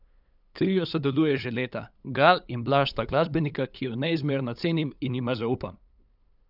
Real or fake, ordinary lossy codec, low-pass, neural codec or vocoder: fake; none; 5.4 kHz; codec, 16 kHz, 8 kbps, FunCodec, trained on LibriTTS, 25 frames a second